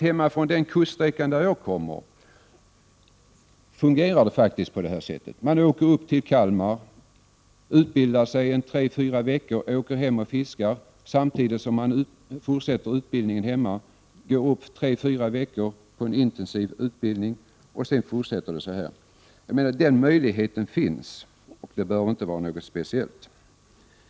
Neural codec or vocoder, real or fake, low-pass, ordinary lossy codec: none; real; none; none